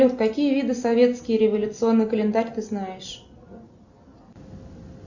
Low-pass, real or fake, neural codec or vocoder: 7.2 kHz; real; none